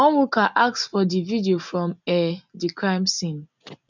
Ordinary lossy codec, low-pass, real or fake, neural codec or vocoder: none; 7.2 kHz; real; none